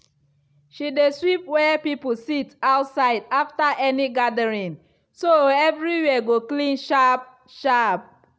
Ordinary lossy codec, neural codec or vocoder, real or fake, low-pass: none; none; real; none